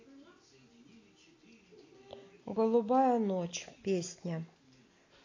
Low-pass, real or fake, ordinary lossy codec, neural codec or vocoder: 7.2 kHz; real; AAC, 32 kbps; none